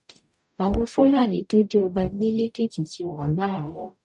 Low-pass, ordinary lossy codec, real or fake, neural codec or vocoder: 10.8 kHz; none; fake; codec, 44.1 kHz, 0.9 kbps, DAC